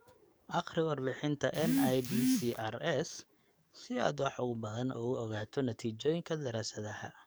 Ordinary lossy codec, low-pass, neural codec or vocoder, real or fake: none; none; codec, 44.1 kHz, 7.8 kbps, DAC; fake